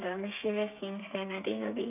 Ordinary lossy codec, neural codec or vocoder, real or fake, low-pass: none; codec, 32 kHz, 1.9 kbps, SNAC; fake; 3.6 kHz